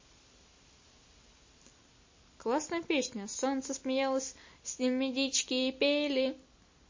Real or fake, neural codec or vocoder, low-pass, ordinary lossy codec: real; none; 7.2 kHz; MP3, 32 kbps